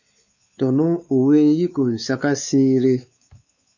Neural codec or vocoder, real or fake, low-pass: codec, 16 kHz, 4 kbps, X-Codec, WavLM features, trained on Multilingual LibriSpeech; fake; 7.2 kHz